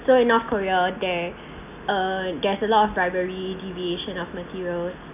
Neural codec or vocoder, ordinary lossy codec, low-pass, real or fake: none; none; 3.6 kHz; real